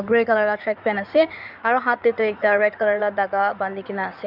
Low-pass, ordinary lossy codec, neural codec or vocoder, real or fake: 5.4 kHz; none; codec, 16 kHz in and 24 kHz out, 2.2 kbps, FireRedTTS-2 codec; fake